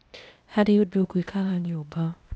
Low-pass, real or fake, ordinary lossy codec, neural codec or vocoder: none; fake; none; codec, 16 kHz, 0.8 kbps, ZipCodec